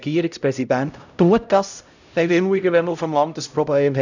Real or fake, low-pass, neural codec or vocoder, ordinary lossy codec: fake; 7.2 kHz; codec, 16 kHz, 0.5 kbps, X-Codec, HuBERT features, trained on LibriSpeech; none